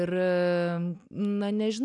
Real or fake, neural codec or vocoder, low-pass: real; none; 10.8 kHz